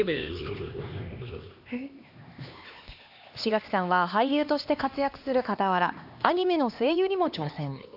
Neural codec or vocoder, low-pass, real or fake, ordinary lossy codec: codec, 16 kHz, 2 kbps, X-Codec, HuBERT features, trained on LibriSpeech; 5.4 kHz; fake; AAC, 48 kbps